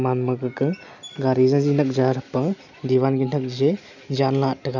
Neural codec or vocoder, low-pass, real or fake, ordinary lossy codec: none; 7.2 kHz; real; none